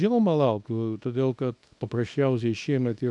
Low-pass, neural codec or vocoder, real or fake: 10.8 kHz; codec, 24 kHz, 0.9 kbps, WavTokenizer, small release; fake